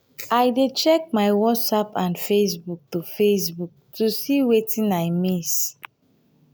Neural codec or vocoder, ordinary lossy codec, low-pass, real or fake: none; none; none; real